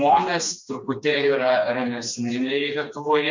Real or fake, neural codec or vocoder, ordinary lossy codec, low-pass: fake; codec, 16 kHz, 2 kbps, FreqCodec, smaller model; MP3, 64 kbps; 7.2 kHz